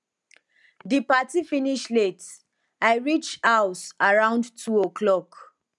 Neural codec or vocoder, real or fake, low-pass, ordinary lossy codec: none; real; 10.8 kHz; none